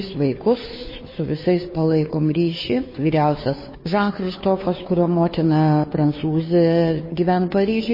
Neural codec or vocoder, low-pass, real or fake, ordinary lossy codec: codec, 16 kHz, 2 kbps, FunCodec, trained on Chinese and English, 25 frames a second; 5.4 kHz; fake; MP3, 24 kbps